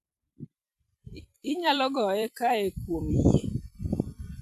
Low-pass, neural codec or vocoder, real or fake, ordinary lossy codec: 14.4 kHz; vocoder, 44.1 kHz, 128 mel bands every 256 samples, BigVGAN v2; fake; none